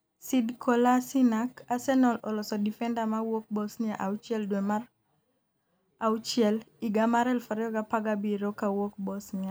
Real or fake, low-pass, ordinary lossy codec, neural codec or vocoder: real; none; none; none